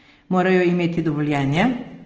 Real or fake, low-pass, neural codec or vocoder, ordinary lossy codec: real; 7.2 kHz; none; Opus, 16 kbps